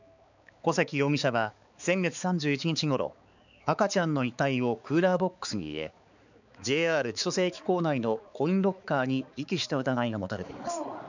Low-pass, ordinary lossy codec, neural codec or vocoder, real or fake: 7.2 kHz; none; codec, 16 kHz, 4 kbps, X-Codec, HuBERT features, trained on balanced general audio; fake